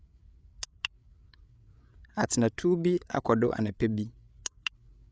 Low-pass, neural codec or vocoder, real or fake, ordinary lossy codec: none; codec, 16 kHz, 8 kbps, FreqCodec, larger model; fake; none